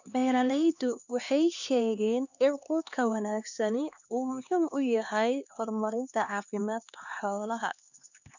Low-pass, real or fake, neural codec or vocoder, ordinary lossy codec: 7.2 kHz; fake; codec, 16 kHz, 2 kbps, X-Codec, HuBERT features, trained on LibriSpeech; none